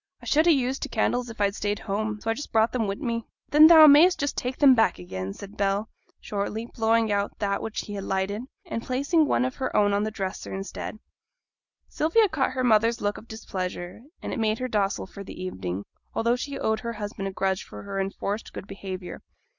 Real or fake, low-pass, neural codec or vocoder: real; 7.2 kHz; none